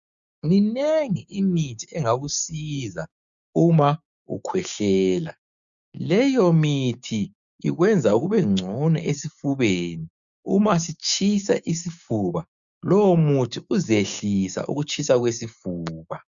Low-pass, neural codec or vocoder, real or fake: 7.2 kHz; codec, 16 kHz, 6 kbps, DAC; fake